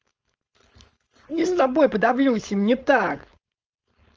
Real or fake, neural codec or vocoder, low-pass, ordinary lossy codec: fake; codec, 16 kHz, 4.8 kbps, FACodec; 7.2 kHz; Opus, 24 kbps